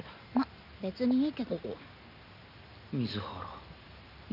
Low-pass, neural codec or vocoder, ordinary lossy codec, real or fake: 5.4 kHz; none; none; real